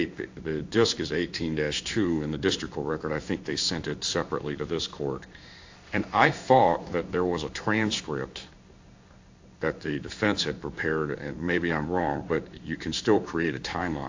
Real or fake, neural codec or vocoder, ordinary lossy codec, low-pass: fake; codec, 16 kHz in and 24 kHz out, 1 kbps, XY-Tokenizer; AAC, 48 kbps; 7.2 kHz